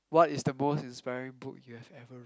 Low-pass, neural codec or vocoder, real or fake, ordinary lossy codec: none; none; real; none